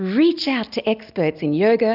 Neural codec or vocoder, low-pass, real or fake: none; 5.4 kHz; real